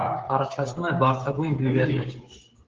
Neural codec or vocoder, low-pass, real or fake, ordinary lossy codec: codec, 16 kHz, 16 kbps, FreqCodec, smaller model; 7.2 kHz; fake; Opus, 16 kbps